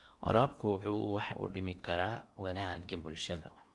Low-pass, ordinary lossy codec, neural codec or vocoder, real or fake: 10.8 kHz; Opus, 64 kbps; codec, 16 kHz in and 24 kHz out, 0.8 kbps, FocalCodec, streaming, 65536 codes; fake